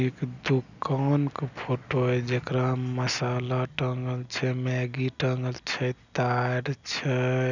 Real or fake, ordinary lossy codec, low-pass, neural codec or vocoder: real; none; 7.2 kHz; none